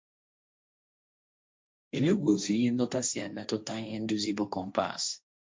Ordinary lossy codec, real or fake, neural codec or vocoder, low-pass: none; fake; codec, 16 kHz, 1.1 kbps, Voila-Tokenizer; none